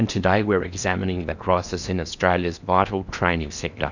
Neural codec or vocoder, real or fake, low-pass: codec, 16 kHz in and 24 kHz out, 0.8 kbps, FocalCodec, streaming, 65536 codes; fake; 7.2 kHz